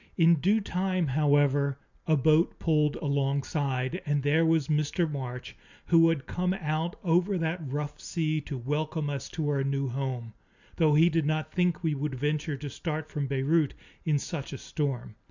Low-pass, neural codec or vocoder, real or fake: 7.2 kHz; none; real